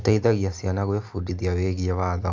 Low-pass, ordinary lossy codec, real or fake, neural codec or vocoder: 7.2 kHz; AAC, 48 kbps; real; none